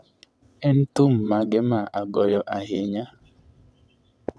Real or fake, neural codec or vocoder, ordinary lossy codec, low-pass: fake; vocoder, 22.05 kHz, 80 mel bands, WaveNeXt; none; none